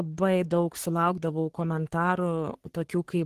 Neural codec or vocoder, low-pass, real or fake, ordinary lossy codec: codec, 44.1 kHz, 3.4 kbps, Pupu-Codec; 14.4 kHz; fake; Opus, 16 kbps